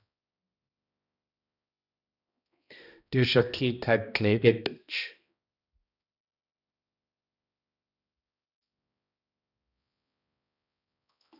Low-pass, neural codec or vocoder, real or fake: 5.4 kHz; codec, 16 kHz, 1 kbps, X-Codec, HuBERT features, trained on balanced general audio; fake